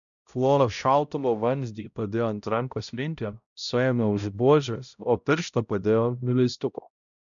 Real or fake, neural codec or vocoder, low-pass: fake; codec, 16 kHz, 0.5 kbps, X-Codec, HuBERT features, trained on balanced general audio; 7.2 kHz